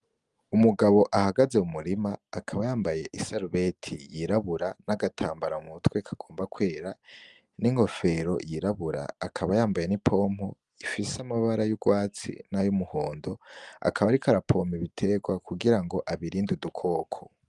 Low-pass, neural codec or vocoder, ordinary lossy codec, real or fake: 10.8 kHz; none; Opus, 32 kbps; real